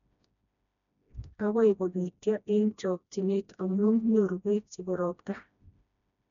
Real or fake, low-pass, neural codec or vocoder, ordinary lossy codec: fake; 7.2 kHz; codec, 16 kHz, 1 kbps, FreqCodec, smaller model; none